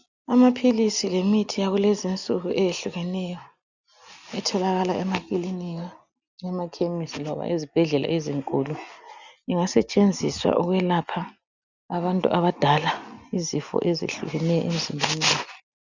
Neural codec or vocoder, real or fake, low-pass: none; real; 7.2 kHz